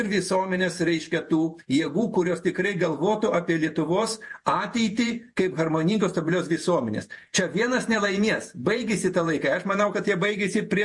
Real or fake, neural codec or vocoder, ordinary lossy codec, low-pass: fake; vocoder, 48 kHz, 128 mel bands, Vocos; MP3, 48 kbps; 10.8 kHz